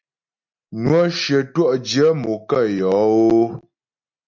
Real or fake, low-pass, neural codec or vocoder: real; 7.2 kHz; none